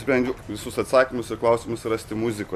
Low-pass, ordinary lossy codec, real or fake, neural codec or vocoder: 14.4 kHz; MP3, 64 kbps; real; none